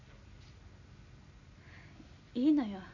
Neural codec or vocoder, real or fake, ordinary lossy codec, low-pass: none; real; none; 7.2 kHz